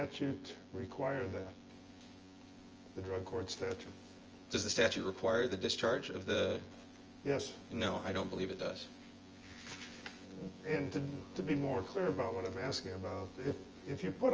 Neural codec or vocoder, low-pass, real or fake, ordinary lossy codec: vocoder, 24 kHz, 100 mel bands, Vocos; 7.2 kHz; fake; Opus, 24 kbps